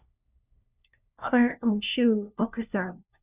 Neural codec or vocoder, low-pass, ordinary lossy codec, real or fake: codec, 16 kHz, 1 kbps, FunCodec, trained on LibriTTS, 50 frames a second; 3.6 kHz; Opus, 64 kbps; fake